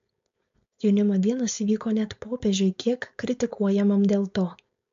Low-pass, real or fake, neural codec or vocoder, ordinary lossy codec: 7.2 kHz; fake; codec, 16 kHz, 4.8 kbps, FACodec; MP3, 48 kbps